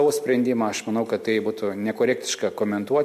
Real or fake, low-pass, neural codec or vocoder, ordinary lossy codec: real; 14.4 kHz; none; MP3, 64 kbps